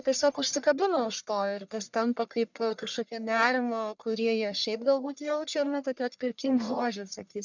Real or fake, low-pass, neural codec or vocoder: fake; 7.2 kHz; codec, 44.1 kHz, 1.7 kbps, Pupu-Codec